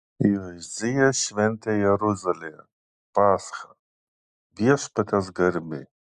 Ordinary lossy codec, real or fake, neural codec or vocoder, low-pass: MP3, 96 kbps; real; none; 10.8 kHz